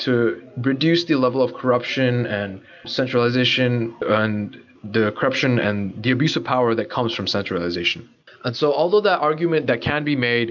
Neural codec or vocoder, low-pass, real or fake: none; 7.2 kHz; real